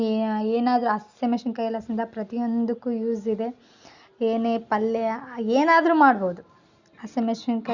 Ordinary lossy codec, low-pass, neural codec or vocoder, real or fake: Opus, 64 kbps; 7.2 kHz; none; real